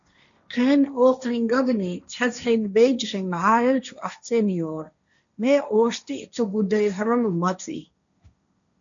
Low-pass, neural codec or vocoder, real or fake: 7.2 kHz; codec, 16 kHz, 1.1 kbps, Voila-Tokenizer; fake